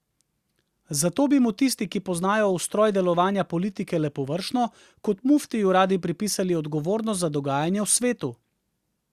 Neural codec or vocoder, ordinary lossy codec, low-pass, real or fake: none; Opus, 64 kbps; 14.4 kHz; real